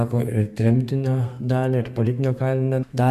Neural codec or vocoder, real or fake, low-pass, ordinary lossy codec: codec, 32 kHz, 1.9 kbps, SNAC; fake; 14.4 kHz; MP3, 64 kbps